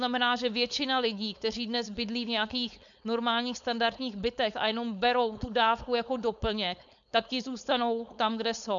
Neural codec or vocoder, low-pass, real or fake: codec, 16 kHz, 4.8 kbps, FACodec; 7.2 kHz; fake